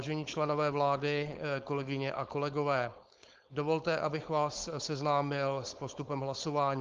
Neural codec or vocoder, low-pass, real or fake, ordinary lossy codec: codec, 16 kHz, 4.8 kbps, FACodec; 7.2 kHz; fake; Opus, 32 kbps